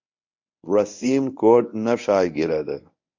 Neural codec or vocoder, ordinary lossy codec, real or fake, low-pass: codec, 24 kHz, 0.9 kbps, WavTokenizer, medium speech release version 1; MP3, 48 kbps; fake; 7.2 kHz